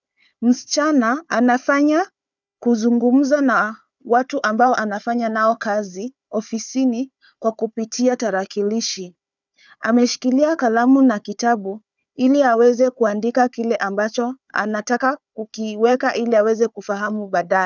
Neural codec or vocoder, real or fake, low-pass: codec, 16 kHz, 4 kbps, FunCodec, trained on Chinese and English, 50 frames a second; fake; 7.2 kHz